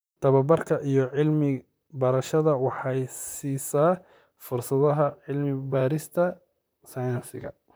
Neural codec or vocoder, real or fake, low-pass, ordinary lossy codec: vocoder, 44.1 kHz, 128 mel bands, Pupu-Vocoder; fake; none; none